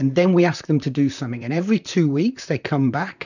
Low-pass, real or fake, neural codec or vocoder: 7.2 kHz; fake; vocoder, 44.1 kHz, 128 mel bands, Pupu-Vocoder